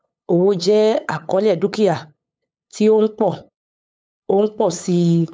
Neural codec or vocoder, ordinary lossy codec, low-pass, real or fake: codec, 16 kHz, 8 kbps, FunCodec, trained on LibriTTS, 25 frames a second; none; none; fake